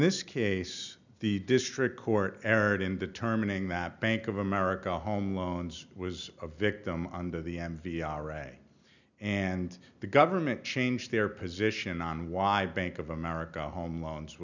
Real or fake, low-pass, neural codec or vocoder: real; 7.2 kHz; none